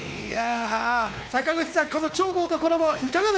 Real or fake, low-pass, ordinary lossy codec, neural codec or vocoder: fake; none; none; codec, 16 kHz, 2 kbps, X-Codec, WavLM features, trained on Multilingual LibriSpeech